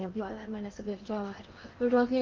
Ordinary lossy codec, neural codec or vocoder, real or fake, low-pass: Opus, 32 kbps; codec, 16 kHz in and 24 kHz out, 0.8 kbps, FocalCodec, streaming, 65536 codes; fake; 7.2 kHz